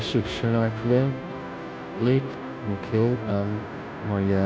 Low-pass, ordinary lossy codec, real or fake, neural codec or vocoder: none; none; fake; codec, 16 kHz, 0.5 kbps, FunCodec, trained on Chinese and English, 25 frames a second